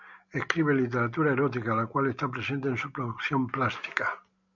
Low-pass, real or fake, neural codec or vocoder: 7.2 kHz; real; none